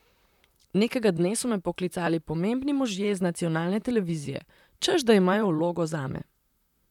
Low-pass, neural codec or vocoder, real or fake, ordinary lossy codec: 19.8 kHz; vocoder, 44.1 kHz, 128 mel bands, Pupu-Vocoder; fake; none